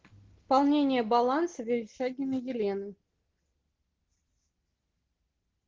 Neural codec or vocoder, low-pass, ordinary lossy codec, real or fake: none; 7.2 kHz; Opus, 16 kbps; real